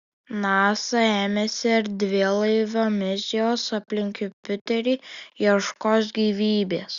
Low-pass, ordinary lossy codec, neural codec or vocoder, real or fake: 7.2 kHz; Opus, 64 kbps; none; real